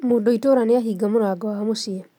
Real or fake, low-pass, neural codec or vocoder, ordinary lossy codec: real; 19.8 kHz; none; none